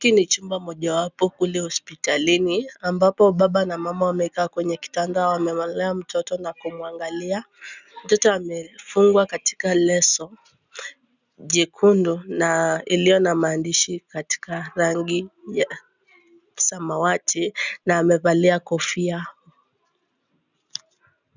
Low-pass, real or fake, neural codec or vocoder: 7.2 kHz; real; none